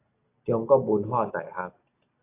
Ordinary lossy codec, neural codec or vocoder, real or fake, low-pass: AAC, 24 kbps; none; real; 3.6 kHz